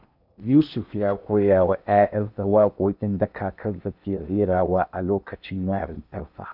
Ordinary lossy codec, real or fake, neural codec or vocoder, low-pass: none; fake; codec, 16 kHz in and 24 kHz out, 0.8 kbps, FocalCodec, streaming, 65536 codes; 5.4 kHz